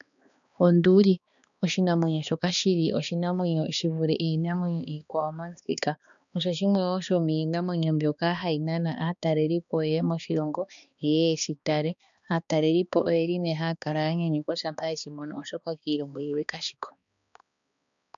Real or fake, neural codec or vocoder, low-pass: fake; codec, 16 kHz, 2 kbps, X-Codec, HuBERT features, trained on balanced general audio; 7.2 kHz